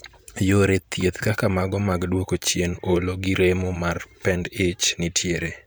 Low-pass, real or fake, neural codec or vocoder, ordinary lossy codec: none; fake; vocoder, 44.1 kHz, 128 mel bands every 512 samples, BigVGAN v2; none